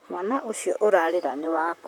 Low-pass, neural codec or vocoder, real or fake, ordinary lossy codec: 19.8 kHz; vocoder, 44.1 kHz, 128 mel bands, Pupu-Vocoder; fake; none